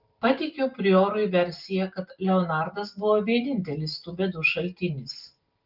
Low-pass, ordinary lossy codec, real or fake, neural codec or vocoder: 5.4 kHz; Opus, 32 kbps; real; none